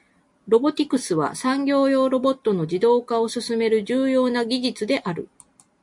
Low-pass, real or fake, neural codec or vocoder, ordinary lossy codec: 10.8 kHz; real; none; MP3, 64 kbps